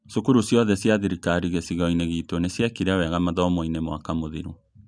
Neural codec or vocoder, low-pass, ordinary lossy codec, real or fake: none; 9.9 kHz; none; real